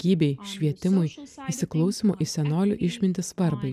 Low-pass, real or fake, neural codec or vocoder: 14.4 kHz; real; none